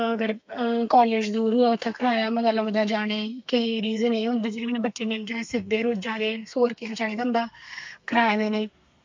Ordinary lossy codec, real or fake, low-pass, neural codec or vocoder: MP3, 48 kbps; fake; 7.2 kHz; codec, 32 kHz, 1.9 kbps, SNAC